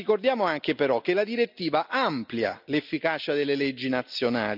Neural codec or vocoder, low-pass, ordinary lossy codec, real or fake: none; 5.4 kHz; none; real